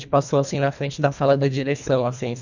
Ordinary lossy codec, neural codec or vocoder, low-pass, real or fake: none; codec, 24 kHz, 1.5 kbps, HILCodec; 7.2 kHz; fake